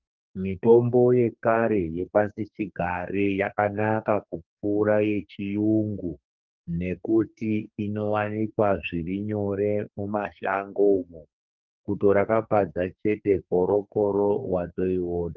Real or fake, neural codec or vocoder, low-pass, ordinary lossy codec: fake; codec, 44.1 kHz, 2.6 kbps, SNAC; 7.2 kHz; Opus, 24 kbps